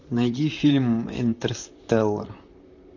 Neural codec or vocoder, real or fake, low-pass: vocoder, 44.1 kHz, 128 mel bands, Pupu-Vocoder; fake; 7.2 kHz